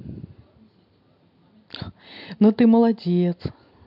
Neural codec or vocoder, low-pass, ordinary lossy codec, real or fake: none; 5.4 kHz; MP3, 48 kbps; real